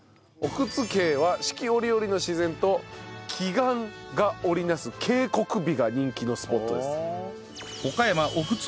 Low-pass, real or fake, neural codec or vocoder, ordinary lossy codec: none; real; none; none